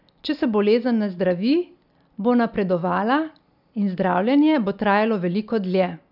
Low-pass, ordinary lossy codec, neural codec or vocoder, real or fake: 5.4 kHz; none; none; real